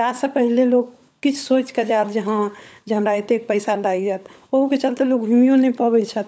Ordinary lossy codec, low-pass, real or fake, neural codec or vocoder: none; none; fake; codec, 16 kHz, 16 kbps, FunCodec, trained on LibriTTS, 50 frames a second